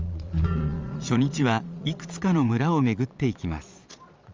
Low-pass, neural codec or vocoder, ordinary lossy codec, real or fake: 7.2 kHz; vocoder, 22.05 kHz, 80 mel bands, Vocos; Opus, 32 kbps; fake